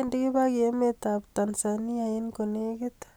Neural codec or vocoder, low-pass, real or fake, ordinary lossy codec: none; none; real; none